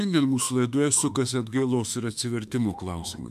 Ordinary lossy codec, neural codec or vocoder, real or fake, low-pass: MP3, 96 kbps; autoencoder, 48 kHz, 32 numbers a frame, DAC-VAE, trained on Japanese speech; fake; 14.4 kHz